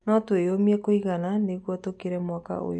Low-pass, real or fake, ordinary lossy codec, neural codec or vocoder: 10.8 kHz; real; none; none